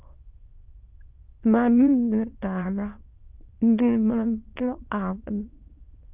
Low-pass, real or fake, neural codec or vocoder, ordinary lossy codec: 3.6 kHz; fake; autoencoder, 22.05 kHz, a latent of 192 numbers a frame, VITS, trained on many speakers; Opus, 24 kbps